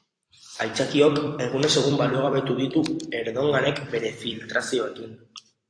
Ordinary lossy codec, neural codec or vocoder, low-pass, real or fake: AAC, 48 kbps; vocoder, 44.1 kHz, 128 mel bands every 256 samples, BigVGAN v2; 9.9 kHz; fake